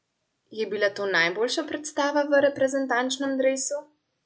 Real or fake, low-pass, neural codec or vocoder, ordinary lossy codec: real; none; none; none